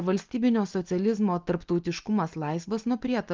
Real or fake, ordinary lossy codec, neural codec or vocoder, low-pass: real; Opus, 24 kbps; none; 7.2 kHz